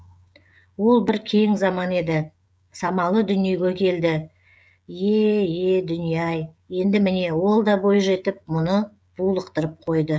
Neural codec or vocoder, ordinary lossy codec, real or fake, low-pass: codec, 16 kHz, 16 kbps, FreqCodec, smaller model; none; fake; none